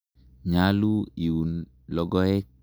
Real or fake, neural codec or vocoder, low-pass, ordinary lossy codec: real; none; none; none